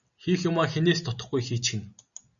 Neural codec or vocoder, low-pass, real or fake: none; 7.2 kHz; real